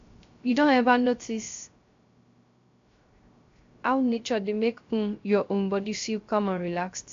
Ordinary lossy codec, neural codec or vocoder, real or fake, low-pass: AAC, 48 kbps; codec, 16 kHz, 0.3 kbps, FocalCodec; fake; 7.2 kHz